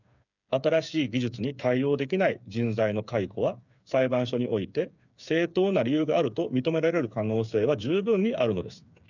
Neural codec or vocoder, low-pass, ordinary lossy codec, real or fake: codec, 16 kHz, 8 kbps, FreqCodec, smaller model; 7.2 kHz; none; fake